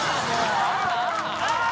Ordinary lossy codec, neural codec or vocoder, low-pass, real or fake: none; none; none; real